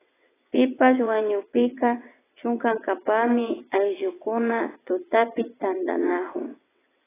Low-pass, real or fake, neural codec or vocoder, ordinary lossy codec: 3.6 kHz; fake; vocoder, 22.05 kHz, 80 mel bands, WaveNeXt; AAC, 16 kbps